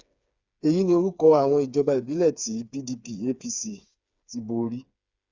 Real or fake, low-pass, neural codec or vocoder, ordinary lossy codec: fake; 7.2 kHz; codec, 16 kHz, 4 kbps, FreqCodec, smaller model; Opus, 64 kbps